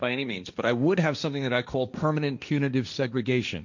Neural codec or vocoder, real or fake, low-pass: codec, 16 kHz, 1.1 kbps, Voila-Tokenizer; fake; 7.2 kHz